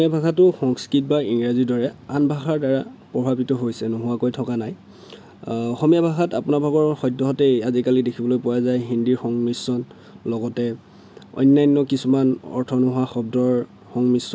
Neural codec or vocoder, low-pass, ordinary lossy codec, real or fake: none; none; none; real